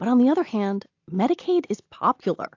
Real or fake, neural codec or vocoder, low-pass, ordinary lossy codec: real; none; 7.2 kHz; AAC, 48 kbps